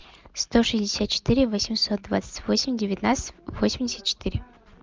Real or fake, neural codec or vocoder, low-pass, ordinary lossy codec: real; none; 7.2 kHz; Opus, 24 kbps